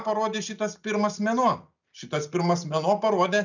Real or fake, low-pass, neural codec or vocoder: real; 7.2 kHz; none